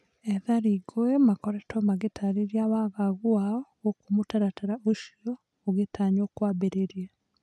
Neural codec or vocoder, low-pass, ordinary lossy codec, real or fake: none; none; none; real